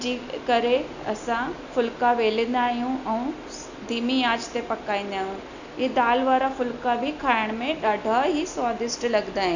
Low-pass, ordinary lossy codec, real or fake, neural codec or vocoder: 7.2 kHz; none; real; none